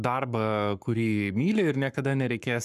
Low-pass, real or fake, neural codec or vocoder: 14.4 kHz; fake; codec, 44.1 kHz, 7.8 kbps, Pupu-Codec